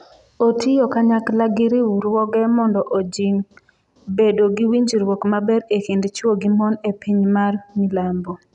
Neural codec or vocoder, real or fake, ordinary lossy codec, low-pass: none; real; none; 10.8 kHz